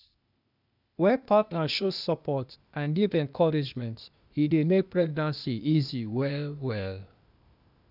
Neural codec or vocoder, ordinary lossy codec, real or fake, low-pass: codec, 16 kHz, 0.8 kbps, ZipCodec; none; fake; 5.4 kHz